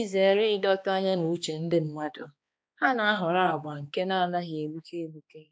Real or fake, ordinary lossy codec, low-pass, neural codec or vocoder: fake; none; none; codec, 16 kHz, 2 kbps, X-Codec, HuBERT features, trained on balanced general audio